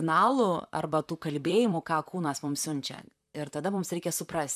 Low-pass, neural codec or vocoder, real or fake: 14.4 kHz; vocoder, 44.1 kHz, 128 mel bands, Pupu-Vocoder; fake